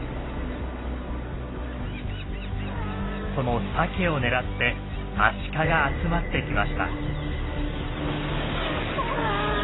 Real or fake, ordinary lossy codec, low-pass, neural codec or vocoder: real; AAC, 16 kbps; 7.2 kHz; none